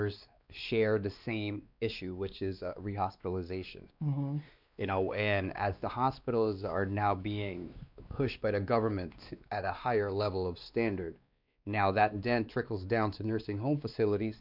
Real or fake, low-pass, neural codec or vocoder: fake; 5.4 kHz; codec, 16 kHz, 2 kbps, X-Codec, WavLM features, trained on Multilingual LibriSpeech